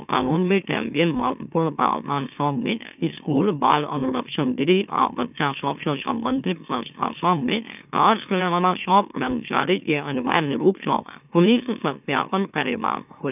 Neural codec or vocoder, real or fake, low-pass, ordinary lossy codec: autoencoder, 44.1 kHz, a latent of 192 numbers a frame, MeloTTS; fake; 3.6 kHz; none